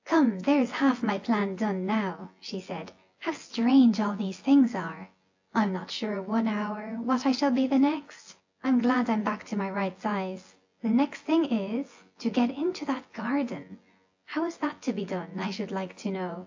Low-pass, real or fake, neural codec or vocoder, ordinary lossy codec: 7.2 kHz; fake; vocoder, 24 kHz, 100 mel bands, Vocos; AAC, 48 kbps